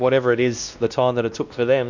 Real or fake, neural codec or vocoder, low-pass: fake; codec, 16 kHz, 1 kbps, X-Codec, WavLM features, trained on Multilingual LibriSpeech; 7.2 kHz